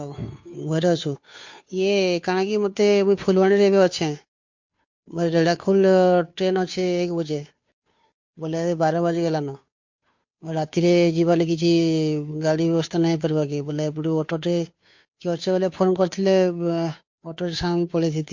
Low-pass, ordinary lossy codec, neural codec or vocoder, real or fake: 7.2 kHz; MP3, 48 kbps; codec, 16 kHz, 2 kbps, FunCodec, trained on Chinese and English, 25 frames a second; fake